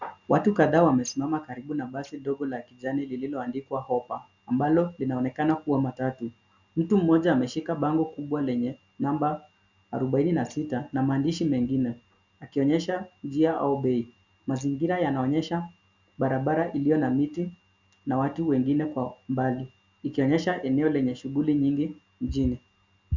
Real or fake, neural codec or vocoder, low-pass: real; none; 7.2 kHz